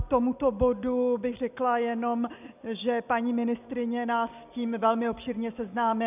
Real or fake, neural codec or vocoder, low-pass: real; none; 3.6 kHz